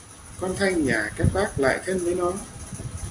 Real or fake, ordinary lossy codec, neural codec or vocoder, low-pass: real; AAC, 64 kbps; none; 10.8 kHz